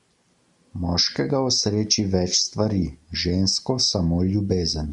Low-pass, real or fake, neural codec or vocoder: 10.8 kHz; real; none